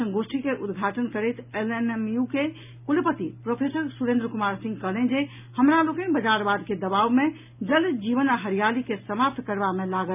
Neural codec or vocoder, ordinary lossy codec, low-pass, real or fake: none; none; 3.6 kHz; real